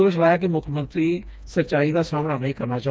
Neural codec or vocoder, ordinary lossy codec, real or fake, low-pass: codec, 16 kHz, 2 kbps, FreqCodec, smaller model; none; fake; none